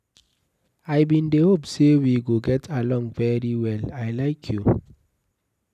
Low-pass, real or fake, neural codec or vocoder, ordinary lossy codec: 14.4 kHz; real; none; none